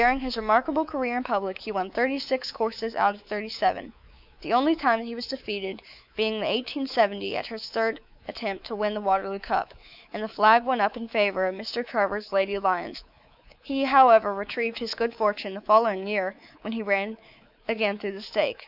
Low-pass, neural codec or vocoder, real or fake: 5.4 kHz; codec, 24 kHz, 3.1 kbps, DualCodec; fake